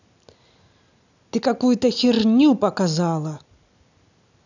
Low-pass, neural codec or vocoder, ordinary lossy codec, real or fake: 7.2 kHz; none; none; real